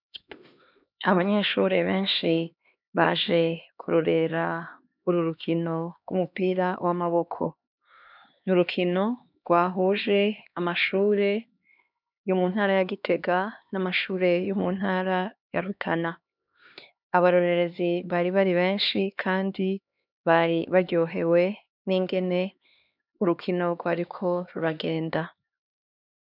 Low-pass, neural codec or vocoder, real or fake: 5.4 kHz; codec, 16 kHz, 2 kbps, X-Codec, HuBERT features, trained on LibriSpeech; fake